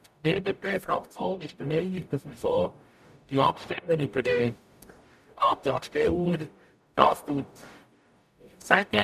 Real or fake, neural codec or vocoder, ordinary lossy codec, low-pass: fake; codec, 44.1 kHz, 0.9 kbps, DAC; none; 14.4 kHz